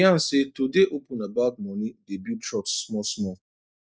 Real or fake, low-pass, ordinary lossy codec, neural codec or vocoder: real; none; none; none